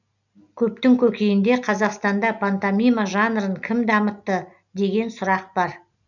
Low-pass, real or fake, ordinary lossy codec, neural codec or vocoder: 7.2 kHz; real; none; none